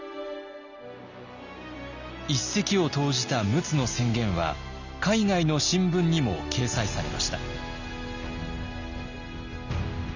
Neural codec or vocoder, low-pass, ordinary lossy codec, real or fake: none; 7.2 kHz; none; real